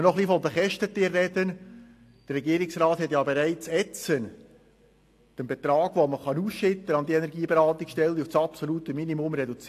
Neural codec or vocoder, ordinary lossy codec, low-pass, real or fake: none; AAC, 64 kbps; 14.4 kHz; real